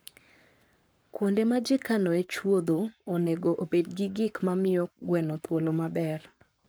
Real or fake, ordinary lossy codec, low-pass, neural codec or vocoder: fake; none; none; codec, 44.1 kHz, 7.8 kbps, Pupu-Codec